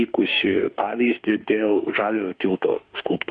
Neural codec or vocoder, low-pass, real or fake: autoencoder, 48 kHz, 32 numbers a frame, DAC-VAE, trained on Japanese speech; 9.9 kHz; fake